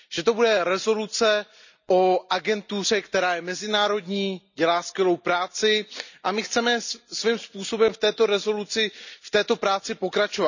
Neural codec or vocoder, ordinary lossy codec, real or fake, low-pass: none; none; real; 7.2 kHz